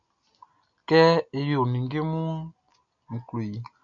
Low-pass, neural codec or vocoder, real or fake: 7.2 kHz; none; real